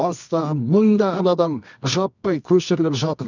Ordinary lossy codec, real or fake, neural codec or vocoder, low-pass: none; fake; codec, 24 kHz, 0.9 kbps, WavTokenizer, medium music audio release; 7.2 kHz